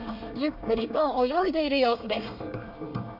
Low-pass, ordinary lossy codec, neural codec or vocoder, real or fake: 5.4 kHz; none; codec, 24 kHz, 1 kbps, SNAC; fake